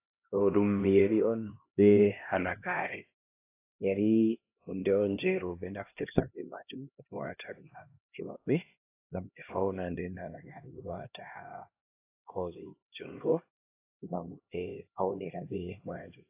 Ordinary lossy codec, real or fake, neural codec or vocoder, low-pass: AAC, 24 kbps; fake; codec, 16 kHz, 1 kbps, X-Codec, HuBERT features, trained on LibriSpeech; 3.6 kHz